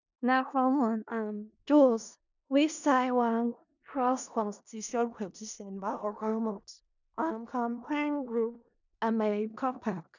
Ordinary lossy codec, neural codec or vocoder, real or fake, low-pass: none; codec, 16 kHz in and 24 kHz out, 0.4 kbps, LongCat-Audio-Codec, four codebook decoder; fake; 7.2 kHz